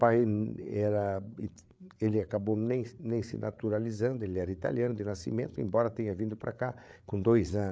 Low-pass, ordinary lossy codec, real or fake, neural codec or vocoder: none; none; fake; codec, 16 kHz, 8 kbps, FreqCodec, larger model